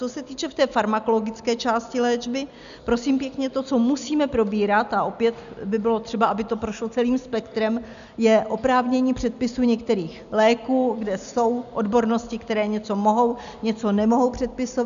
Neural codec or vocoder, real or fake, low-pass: none; real; 7.2 kHz